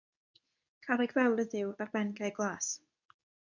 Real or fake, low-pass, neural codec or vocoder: fake; 7.2 kHz; codec, 24 kHz, 0.9 kbps, WavTokenizer, medium speech release version 2